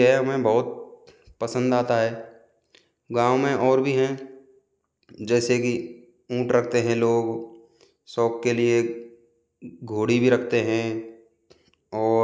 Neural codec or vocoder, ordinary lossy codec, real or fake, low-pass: none; none; real; none